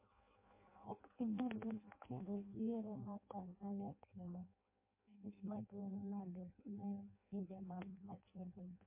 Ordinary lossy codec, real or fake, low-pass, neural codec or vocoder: MP3, 24 kbps; fake; 3.6 kHz; codec, 16 kHz in and 24 kHz out, 0.6 kbps, FireRedTTS-2 codec